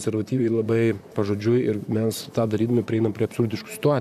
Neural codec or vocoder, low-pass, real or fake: vocoder, 44.1 kHz, 128 mel bands, Pupu-Vocoder; 14.4 kHz; fake